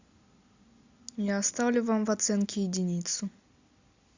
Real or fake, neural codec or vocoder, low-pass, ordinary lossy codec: real; none; 7.2 kHz; Opus, 64 kbps